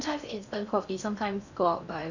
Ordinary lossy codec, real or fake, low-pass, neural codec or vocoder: none; fake; 7.2 kHz; codec, 16 kHz in and 24 kHz out, 0.6 kbps, FocalCodec, streaming, 4096 codes